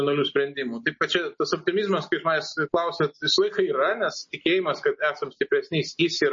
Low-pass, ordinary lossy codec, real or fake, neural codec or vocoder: 7.2 kHz; MP3, 32 kbps; real; none